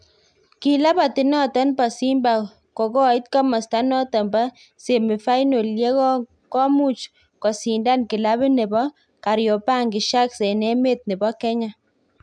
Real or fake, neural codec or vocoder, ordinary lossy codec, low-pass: real; none; MP3, 96 kbps; 9.9 kHz